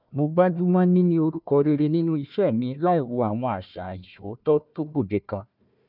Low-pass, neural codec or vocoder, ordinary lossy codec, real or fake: 5.4 kHz; codec, 16 kHz, 1 kbps, FunCodec, trained on Chinese and English, 50 frames a second; none; fake